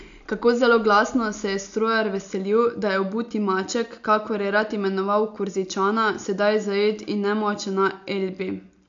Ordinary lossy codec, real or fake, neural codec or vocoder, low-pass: AAC, 64 kbps; real; none; 7.2 kHz